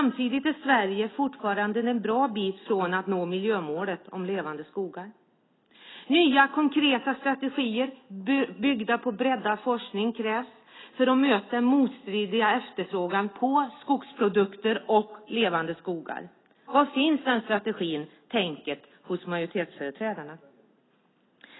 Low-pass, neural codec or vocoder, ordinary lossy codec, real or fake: 7.2 kHz; none; AAC, 16 kbps; real